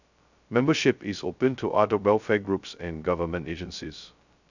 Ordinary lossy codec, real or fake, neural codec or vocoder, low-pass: none; fake; codec, 16 kHz, 0.2 kbps, FocalCodec; 7.2 kHz